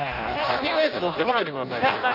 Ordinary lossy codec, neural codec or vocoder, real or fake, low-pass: none; codec, 16 kHz in and 24 kHz out, 0.6 kbps, FireRedTTS-2 codec; fake; 5.4 kHz